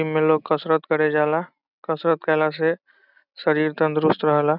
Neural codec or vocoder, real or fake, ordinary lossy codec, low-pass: none; real; none; 5.4 kHz